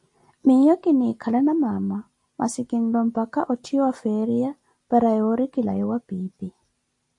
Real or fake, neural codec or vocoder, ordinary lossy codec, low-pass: real; none; MP3, 48 kbps; 10.8 kHz